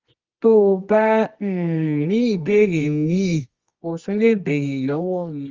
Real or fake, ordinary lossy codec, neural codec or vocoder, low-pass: fake; Opus, 32 kbps; codec, 24 kHz, 0.9 kbps, WavTokenizer, medium music audio release; 7.2 kHz